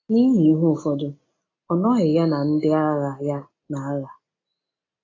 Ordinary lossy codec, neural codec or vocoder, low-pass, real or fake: AAC, 32 kbps; none; 7.2 kHz; real